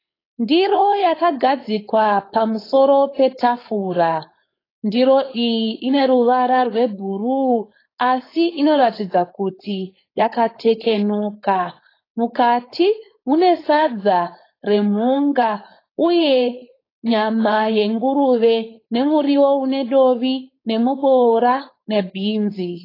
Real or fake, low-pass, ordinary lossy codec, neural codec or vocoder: fake; 5.4 kHz; AAC, 24 kbps; codec, 16 kHz, 4.8 kbps, FACodec